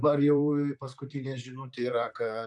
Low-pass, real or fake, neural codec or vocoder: 10.8 kHz; fake; vocoder, 44.1 kHz, 128 mel bands, Pupu-Vocoder